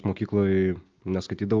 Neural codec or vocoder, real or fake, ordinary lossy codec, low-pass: none; real; Opus, 24 kbps; 7.2 kHz